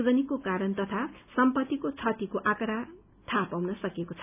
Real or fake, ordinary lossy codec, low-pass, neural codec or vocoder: real; none; 3.6 kHz; none